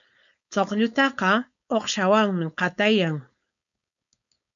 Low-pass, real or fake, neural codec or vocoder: 7.2 kHz; fake; codec, 16 kHz, 4.8 kbps, FACodec